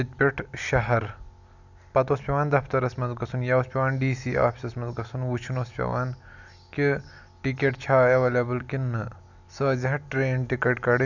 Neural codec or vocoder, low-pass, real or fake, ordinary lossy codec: none; 7.2 kHz; real; none